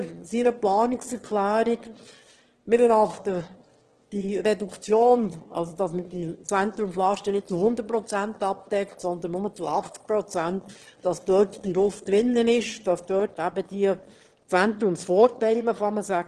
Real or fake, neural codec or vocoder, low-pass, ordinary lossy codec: fake; autoencoder, 22.05 kHz, a latent of 192 numbers a frame, VITS, trained on one speaker; 9.9 kHz; Opus, 16 kbps